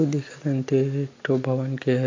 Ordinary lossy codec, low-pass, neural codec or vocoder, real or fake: MP3, 48 kbps; 7.2 kHz; none; real